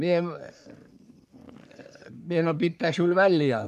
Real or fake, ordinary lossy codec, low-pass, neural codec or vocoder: fake; none; 14.4 kHz; codec, 44.1 kHz, 3.4 kbps, Pupu-Codec